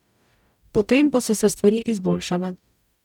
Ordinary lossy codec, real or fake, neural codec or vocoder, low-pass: none; fake; codec, 44.1 kHz, 0.9 kbps, DAC; 19.8 kHz